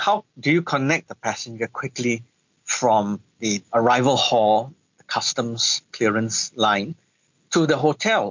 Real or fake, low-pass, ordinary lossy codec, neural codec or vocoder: real; 7.2 kHz; MP3, 48 kbps; none